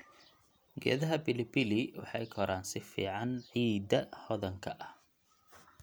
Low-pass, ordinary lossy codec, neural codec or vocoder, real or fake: none; none; none; real